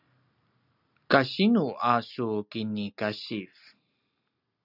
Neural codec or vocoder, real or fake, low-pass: none; real; 5.4 kHz